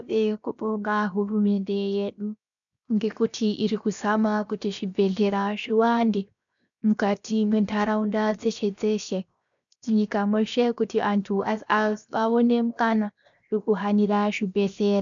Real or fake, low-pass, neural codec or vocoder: fake; 7.2 kHz; codec, 16 kHz, 0.7 kbps, FocalCodec